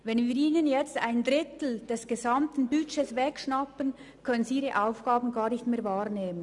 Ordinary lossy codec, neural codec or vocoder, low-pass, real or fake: none; none; 10.8 kHz; real